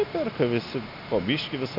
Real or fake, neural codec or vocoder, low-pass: real; none; 5.4 kHz